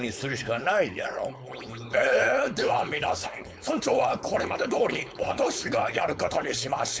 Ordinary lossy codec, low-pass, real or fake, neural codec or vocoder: none; none; fake; codec, 16 kHz, 4.8 kbps, FACodec